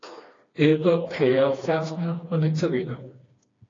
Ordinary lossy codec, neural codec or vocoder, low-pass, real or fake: AAC, 32 kbps; codec, 16 kHz, 2 kbps, FreqCodec, smaller model; 7.2 kHz; fake